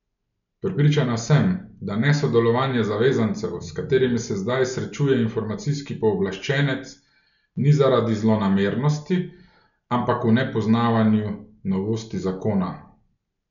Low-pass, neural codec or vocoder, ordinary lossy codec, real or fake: 7.2 kHz; none; none; real